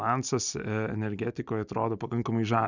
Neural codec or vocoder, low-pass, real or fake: none; 7.2 kHz; real